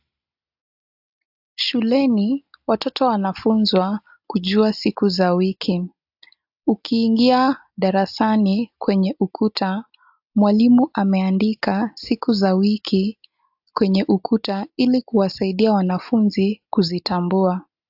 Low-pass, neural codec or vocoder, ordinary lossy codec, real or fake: 5.4 kHz; none; AAC, 48 kbps; real